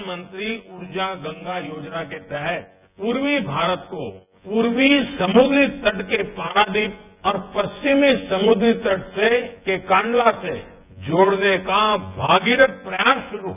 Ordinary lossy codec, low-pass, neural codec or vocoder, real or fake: none; 3.6 kHz; vocoder, 24 kHz, 100 mel bands, Vocos; fake